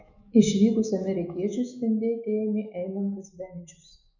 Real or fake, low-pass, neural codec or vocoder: fake; 7.2 kHz; autoencoder, 48 kHz, 128 numbers a frame, DAC-VAE, trained on Japanese speech